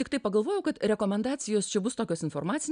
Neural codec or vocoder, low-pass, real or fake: none; 9.9 kHz; real